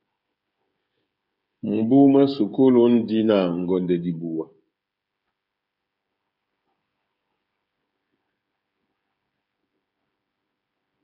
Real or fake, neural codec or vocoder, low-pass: fake; codec, 16 kHz, 16 kbps, FreqCodec, smaller model; 5.4 kHz